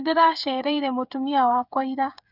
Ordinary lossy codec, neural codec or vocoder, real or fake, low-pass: none; codec, 16 kHz, 8 kbps, FreqCodec, smaller model; fake; 5.4 kHz